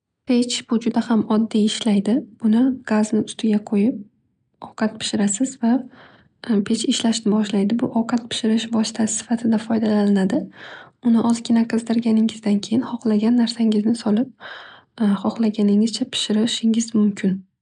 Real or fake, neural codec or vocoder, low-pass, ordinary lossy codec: real; none; 9.9 kHz; none